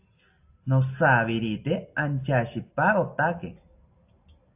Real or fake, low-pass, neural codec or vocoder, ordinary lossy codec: real; 3.6 kHz; none; AAC, 32 kbps